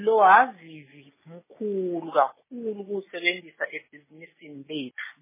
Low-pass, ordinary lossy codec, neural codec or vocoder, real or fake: 3.6 kHz; MP3, 16 kbps; none; real